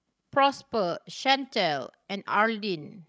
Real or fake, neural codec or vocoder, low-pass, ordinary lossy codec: fake; codec, 16 kHz, 16 kbps, FreqCodec, larger model; none; none